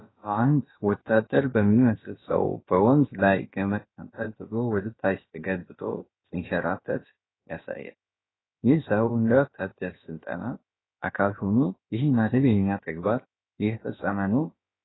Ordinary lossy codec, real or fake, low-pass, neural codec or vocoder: AAC, 16 kbps; fake; 7.2 kHz; codec, 16 kHz, about 1 kbps, DyCAST, with the encoder's durations